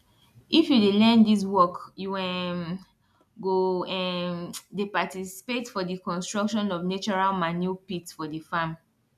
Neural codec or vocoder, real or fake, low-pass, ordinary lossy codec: none; real; 14.4 kHz; none